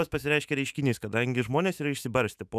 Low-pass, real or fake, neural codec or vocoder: 14.4 kHz; fake; autoencoder, 48 kHz, 128 numbers a frame, DAC-VAE, trained on Japanese speech